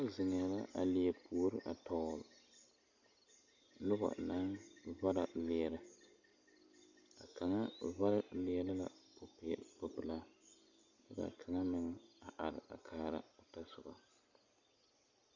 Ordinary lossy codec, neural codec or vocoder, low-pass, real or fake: Opus, 64 kbps; none; 7.2 kHz; real